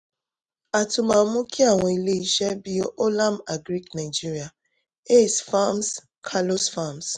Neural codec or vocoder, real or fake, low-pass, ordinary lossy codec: none; real; 10.8 kHz; none